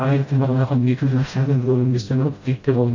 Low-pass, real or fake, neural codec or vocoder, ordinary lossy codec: 7.2 kHz; fake; codec, 16 kHz, 0.5 kbps, FreqCodec, smaller model; AAC, 48 kbps